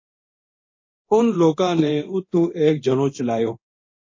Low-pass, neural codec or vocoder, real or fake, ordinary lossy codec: 7.2 kHz; codec, 24 kHz, 0.9 kbps, DualCodec; fake; MP3, 32 kbps